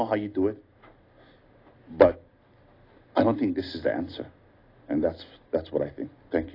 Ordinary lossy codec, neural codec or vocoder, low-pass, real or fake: AAC, 24 kbps; none; 5.4 kHz; real